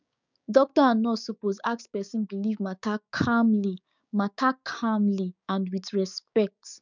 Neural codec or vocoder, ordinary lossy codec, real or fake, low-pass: codec, 16 kHz, 6 kbps, DAC; none; fake; 7.2 kHz